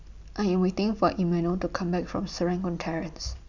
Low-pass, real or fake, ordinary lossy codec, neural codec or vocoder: 7.2 kHz; real; none; none